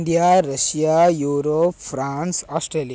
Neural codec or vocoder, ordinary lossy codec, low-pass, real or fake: none; none; none; real